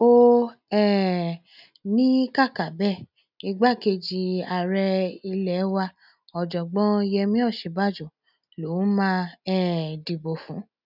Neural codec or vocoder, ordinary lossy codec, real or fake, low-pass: none; none; real; 5.4 kHz